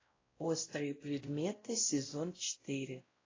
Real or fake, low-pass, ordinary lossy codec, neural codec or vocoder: fake; 7.2 kHz; AAC, 32 kbps; codec, 16 kHz, 0.5 kbps, X-Codec, WavLM features, trained on Multilingual LibriSpeech